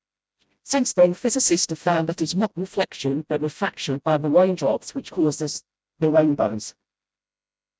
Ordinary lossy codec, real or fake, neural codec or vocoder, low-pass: none; fake; codec, 16 kHz, 0.5 kbps, FreqCodec, smaller model; none